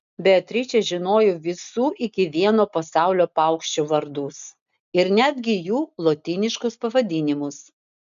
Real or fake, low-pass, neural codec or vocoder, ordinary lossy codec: real; 7.2 kHz; none; MP3, 96 kbps